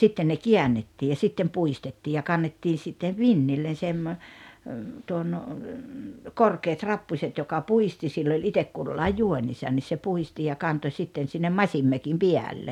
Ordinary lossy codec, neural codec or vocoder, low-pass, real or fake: none; none; 19.8 kHz; real